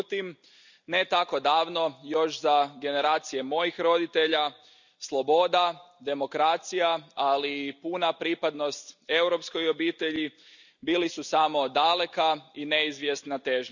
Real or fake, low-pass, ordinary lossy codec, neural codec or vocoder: real; 7.2 kHz; none; none